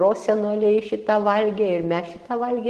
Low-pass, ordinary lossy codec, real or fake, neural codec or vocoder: 10.8 kHz; Opus, 16 kbps; real; none